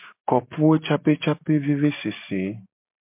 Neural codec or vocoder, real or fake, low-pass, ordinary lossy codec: none; real; 3.6 kHz; MP3, 32 kbps